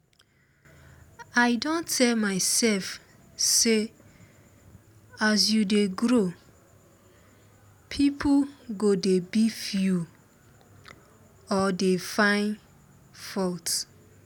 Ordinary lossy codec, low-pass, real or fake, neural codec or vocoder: none; none; real; none